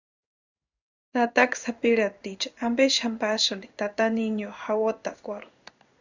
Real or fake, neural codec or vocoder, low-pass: fake; codec, 16 kHz in and 24 kHz out, 1 kbps, XY-Tokenizer; 7.2 kHz